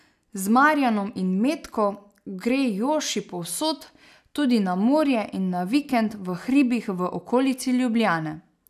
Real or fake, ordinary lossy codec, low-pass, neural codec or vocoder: real; none; 14.4 kHz; none